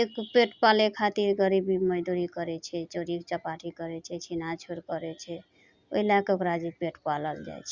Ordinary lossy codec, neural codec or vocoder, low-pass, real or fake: Opus, 64 kbps; none; 7.2 kHz; real